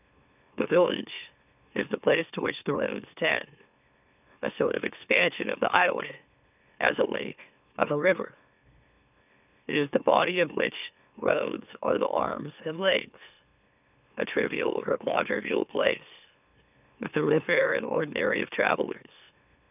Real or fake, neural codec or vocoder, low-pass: fake; autoencoder, 44.1 kHz, a latent of 192 numbers a frame, MeloTTS; 3.6 kHz